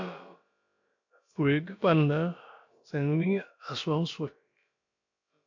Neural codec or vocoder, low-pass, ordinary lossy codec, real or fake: codec, 16 kHz, about 1 kbps, DyCAST, with the encoder's durations; 7.2 kHz; MP3, 48 kbps; fake